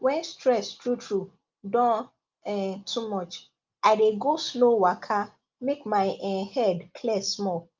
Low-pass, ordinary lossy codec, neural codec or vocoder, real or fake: 7.2 kHz; Opus, 24 kbps; none; real